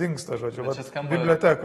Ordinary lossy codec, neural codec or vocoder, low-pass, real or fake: AAC, 32 kbps; none; 19.8 kHz; real